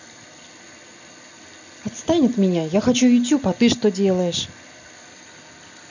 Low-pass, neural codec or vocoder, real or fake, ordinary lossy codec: 7.2 kHz; none; real; none